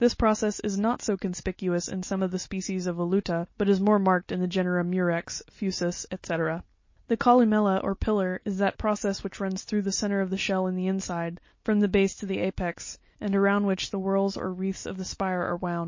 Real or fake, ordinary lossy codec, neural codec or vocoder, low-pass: real; MP3, 32 kbps; none; 7.2 kHz